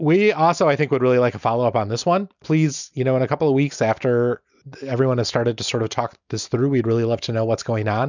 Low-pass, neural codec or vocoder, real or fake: 7.2 kHz; none; real